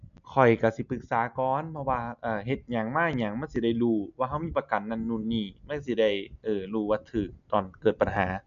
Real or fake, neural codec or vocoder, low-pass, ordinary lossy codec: real; none; 7.2 kHz; none